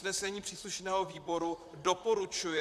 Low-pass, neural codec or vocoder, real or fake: 14.4 kHz; vocoder, 44.1 kHz, 128 mel bands, Pupu-Vocoder; fake